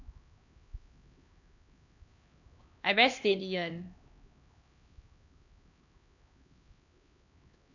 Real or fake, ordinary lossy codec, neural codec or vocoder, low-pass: fake; none; codec, 16 kHz, 2 kbps, X-Codec, HuBERT features, trained on LibriSpeech; 7.2 kHz